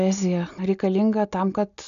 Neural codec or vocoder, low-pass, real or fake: none; 7.2 kHz; real